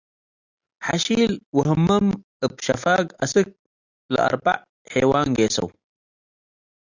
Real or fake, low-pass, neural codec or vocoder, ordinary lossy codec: real; 7.2 kHz; none; Opus, 64 kbps